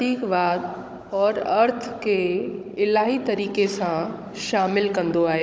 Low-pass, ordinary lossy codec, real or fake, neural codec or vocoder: none; none; fake; codec, 16 kHz, 16 kbps, FunCodec, trained on Chinese and English, 50 frames a second